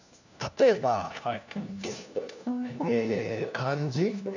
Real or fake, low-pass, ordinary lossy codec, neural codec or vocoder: fake; 7.2 kHz; none; codec, 16 kHz, 1 kbps, FunCodec, trained on LibriTTS, 50 frames a second